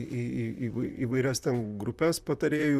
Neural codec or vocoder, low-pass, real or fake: vocoder, 44.1 kHz, 128 mel bands, Pupu-Vocoder; 14.4 kHz; fake